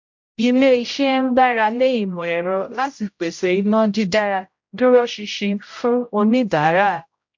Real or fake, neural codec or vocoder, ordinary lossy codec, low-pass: fake; codec, 16 kHz, 0.5 kbps, X-Codec, HuBERT features, trained on general audio; MP3, 48 kbps; 7.2 kHz